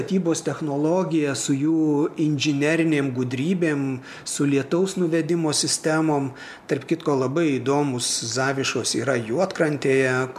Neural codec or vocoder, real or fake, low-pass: none; real; 14.4 kHz